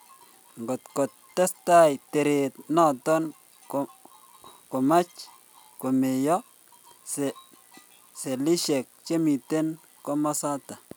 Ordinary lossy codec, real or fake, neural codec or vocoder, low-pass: none; real; none; none